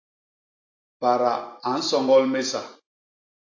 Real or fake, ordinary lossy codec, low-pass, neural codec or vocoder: real; AAC, 48 kbps; 7.2 kHz; none